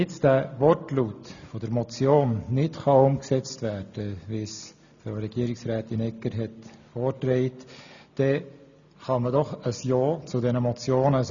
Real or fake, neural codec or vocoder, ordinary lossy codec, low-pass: real; none; none; 7.2 kHz